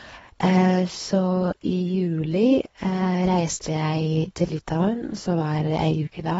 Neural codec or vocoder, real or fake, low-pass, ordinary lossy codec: codec, 24 kHz, 3 kbps, HILCodec; fake; 10.8 kHz; AAC, 24 kbps